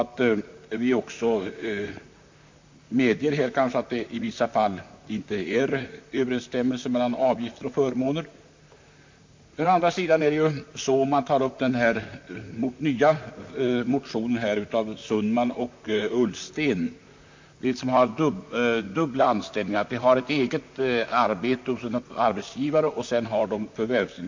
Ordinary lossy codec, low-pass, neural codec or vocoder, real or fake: MP3, 48 kbps; 7.2 kHz; vocoder, 44.1 kHz, 128 mel bands, Pupu-Vocoder; fake